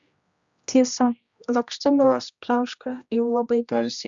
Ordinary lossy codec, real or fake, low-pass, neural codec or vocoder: Opus, 64 kbps; fake; 7.2 kHz; codec, 16 kHz, 1 kbps, X-Codec, HuBERT features, trained on general audio